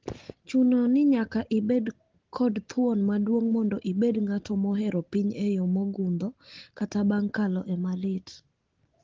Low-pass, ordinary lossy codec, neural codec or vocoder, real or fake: 7.2 kHz; Opus, 16 kbps; none; real